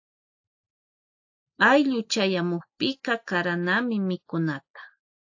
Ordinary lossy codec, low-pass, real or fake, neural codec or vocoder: AAC, 48 kbps; 7.2 kHz; real; none